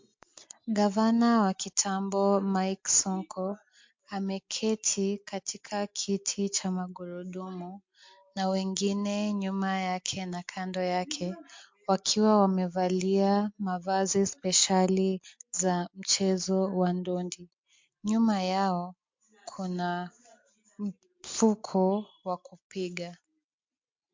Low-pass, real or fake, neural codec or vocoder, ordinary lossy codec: 7.2 kHz; real; none; MP3, 48 kbps